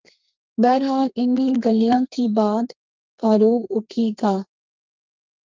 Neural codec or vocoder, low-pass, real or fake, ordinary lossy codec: codec, 32 kHz, 1.9 kbps, SNAC; 7.2 kHz; fake; Opus, 24 kbps